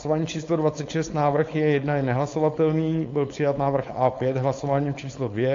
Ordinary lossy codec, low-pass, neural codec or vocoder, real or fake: AAC, 48 kbps; 7.2 kHz; codec, 16 kHz, 4.8 kbps, FACodec; fake